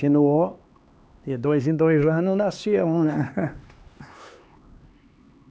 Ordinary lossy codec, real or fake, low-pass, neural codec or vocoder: none; fake; none; codec, 16 kHz, 2 kbps, X-Codec, HuBERT features, trained on LibriSpeech